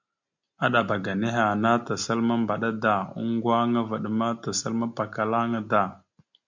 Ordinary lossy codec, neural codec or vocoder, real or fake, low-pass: MP3, 64 kbps; none; real; 7.2 kHz